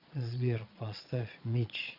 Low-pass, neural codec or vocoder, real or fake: 5.4 kHz; vocoder, 22.05 kHz, 80 mel bands, Vocos; fake